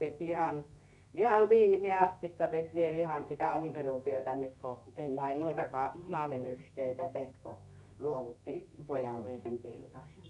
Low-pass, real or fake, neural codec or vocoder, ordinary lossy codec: 10.8 kHz; fake; codec, 24 kHz, 0.9 kbps, WavTokenizer, medium music audio release; none